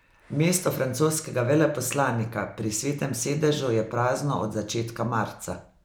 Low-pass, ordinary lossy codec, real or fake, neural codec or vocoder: none; none; real; none